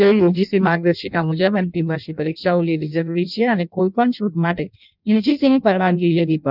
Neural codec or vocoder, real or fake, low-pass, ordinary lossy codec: codec, 16 kHz in and 24 kHz out, 0.6 kbps, FireRedTTS-2 codec; fake; 5.4 kHz; none